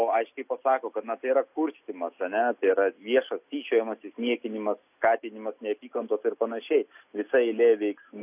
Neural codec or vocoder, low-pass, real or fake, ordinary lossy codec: none; 3.6 kHz; real; AAC, 32 kbps